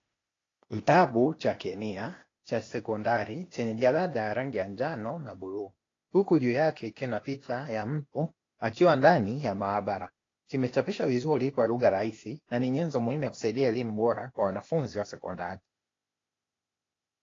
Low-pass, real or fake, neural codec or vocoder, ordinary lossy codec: 7.2 kHz; fake; codec, 16 kHz, 0.8 kbps, ZipCodec; AAC, 32 kbps